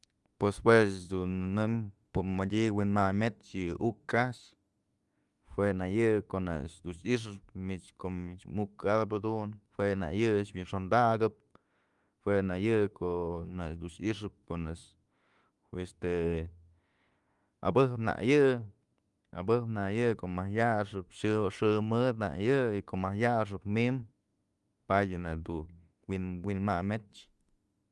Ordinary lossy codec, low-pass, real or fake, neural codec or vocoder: Opus, 32 kbps; 10.8 kHz; fake; autoencoder, 48 kHz, 32 numbers a frame, DAC-VAE, trained on Japanese speech